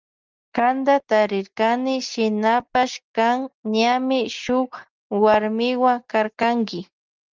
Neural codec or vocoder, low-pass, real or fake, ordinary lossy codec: none; 7.2 kHz; real; Opus, 24 kbps